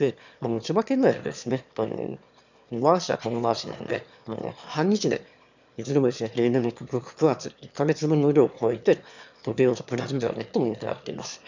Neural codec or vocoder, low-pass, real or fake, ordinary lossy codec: autoencoder, 22.05 kHz, a latent of 192 numbers a frame, VITS, trained on one speaker; 7.2 kHz; fake; none